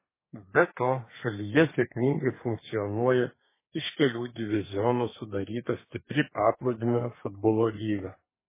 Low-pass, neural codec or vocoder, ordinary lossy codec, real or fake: 3.6 kHz; codec, 32 kHz, 1.9 kbps, SNAC; MP3, 16 kbps; fake